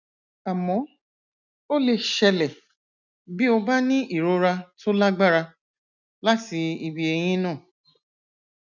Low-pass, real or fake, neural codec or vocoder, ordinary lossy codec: 7.2 kHz; real; none; none